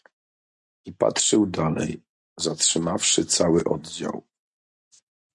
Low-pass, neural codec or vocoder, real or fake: 10.8 kHz; none; real